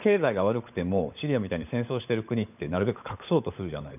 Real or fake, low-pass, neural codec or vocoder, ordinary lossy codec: fake; 3.6 kHz; codec, 16 kHz, 16 kbps, FunCodec, trained on LibriTTS, 50 frames a second; none